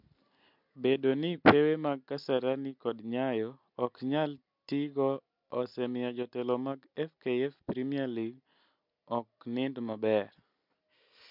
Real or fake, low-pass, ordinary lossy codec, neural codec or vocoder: real; 5.4 kHz; MP3, 48 kbps; none